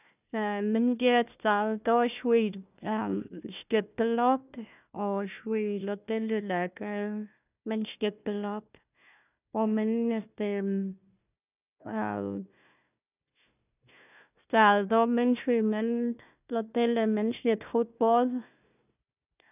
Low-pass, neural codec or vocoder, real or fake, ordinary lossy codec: 3.6 kHz; codec, 16 kHz, 1 kbps, FunCodec, trained on Chinese and English, 50 frames a second; fake; none